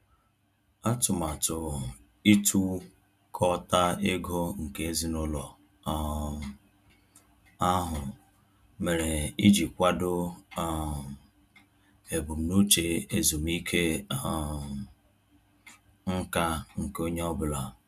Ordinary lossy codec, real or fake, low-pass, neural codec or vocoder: none; real; 14.4 kHz; none